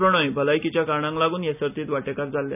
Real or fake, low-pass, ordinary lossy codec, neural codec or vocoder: real; 3.6 kHz; none; none